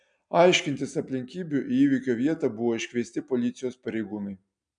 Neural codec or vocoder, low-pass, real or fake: none; 9.9 kHz; real